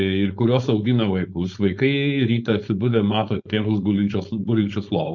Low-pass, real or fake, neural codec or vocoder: 7.2 kHz; fake; codec, 16 kHz, 4.8 kbps, FACodec